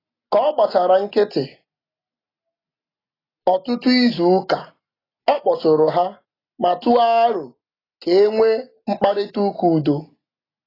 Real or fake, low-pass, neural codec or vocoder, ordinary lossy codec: real; 5.4 kHz; none; AAC, 24 kbps